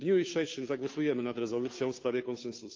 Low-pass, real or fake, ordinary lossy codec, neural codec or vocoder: 7.2 kHz; fake; Opus, 24 kbps; codec, 16 kHz, 2 kbps, FunCodec, trained on Chinese and English, 25 frames a second